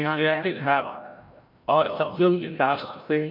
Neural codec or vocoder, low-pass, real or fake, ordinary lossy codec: codec, 16 kHz, 0.5 kbps, FreqCodec, larger model; 5.4 kHz; fake; MP3, 48 kbps